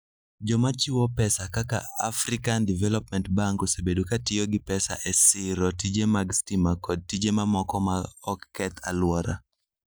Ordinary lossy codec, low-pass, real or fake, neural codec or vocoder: none; none; real; none